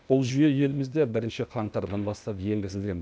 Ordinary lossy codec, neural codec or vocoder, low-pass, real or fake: none; codec, 16 kHz, 0.8 kbps, ZipCodec; none; fake